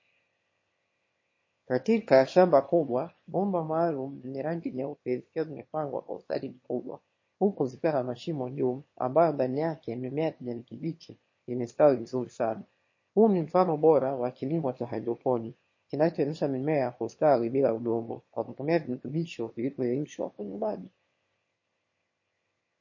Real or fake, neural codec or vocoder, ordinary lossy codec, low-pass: fake; autoencoder, 22.05 kHz, a latent of 192 numbers a frame, VITS, trained on one speaker; MP3, 32 kbps; 7.2 kHz